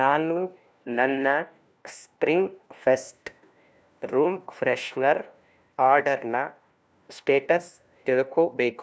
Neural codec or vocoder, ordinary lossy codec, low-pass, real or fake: codec, 16 kHz, 1 kbps, FunCodec, trained on LibriTTS, 50 frames a second; none; none; fake